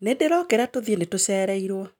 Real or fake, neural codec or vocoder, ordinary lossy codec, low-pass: real; none; none; 19.8 kHz